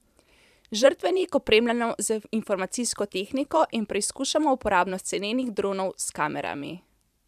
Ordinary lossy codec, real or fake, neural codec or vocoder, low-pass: none; fake; vocoder, 44.1 kHz, 128 mel bands every 512 samples, BigVGAN v2; 14.4 kHz